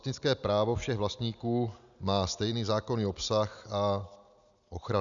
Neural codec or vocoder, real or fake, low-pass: none; real; 7.2 kHz